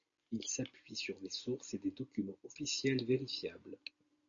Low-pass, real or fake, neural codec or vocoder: 7.2 kHz; real; none